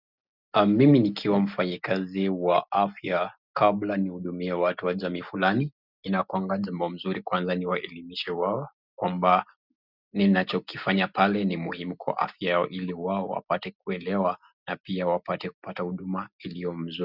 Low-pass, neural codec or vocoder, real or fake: 5.4 kHz; none; real